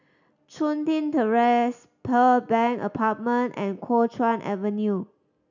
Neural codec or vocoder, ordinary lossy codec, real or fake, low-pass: none; none; real; 7.2 kHz